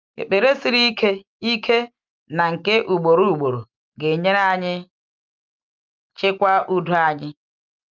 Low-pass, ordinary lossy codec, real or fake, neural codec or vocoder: 7.2 kHz; Opus, 24 kbps; real; none